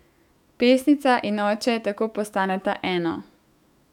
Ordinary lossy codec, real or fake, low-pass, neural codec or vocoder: none; fake; 19.8 kHz; autoencoder, 48 kHz, 128 numbers a frame, DAC-VAE, trained on Japanese speech